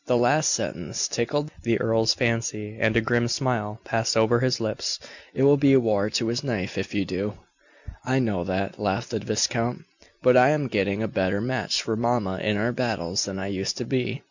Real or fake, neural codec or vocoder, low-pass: real; none; 7.2 kHz